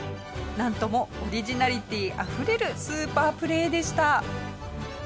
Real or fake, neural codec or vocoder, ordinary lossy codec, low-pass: real; none; none; none